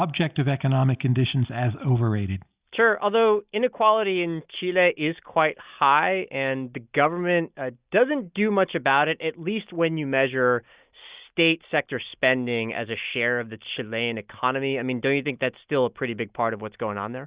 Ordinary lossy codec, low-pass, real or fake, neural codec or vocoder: Opus, 64 kbps; 3.6 kHz; real; none